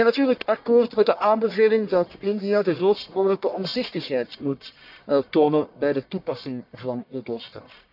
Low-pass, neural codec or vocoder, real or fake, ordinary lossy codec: 5.4 kHz; codec, 44.1 kHz, 1.7 kbps, Pupu-Codec; fake; none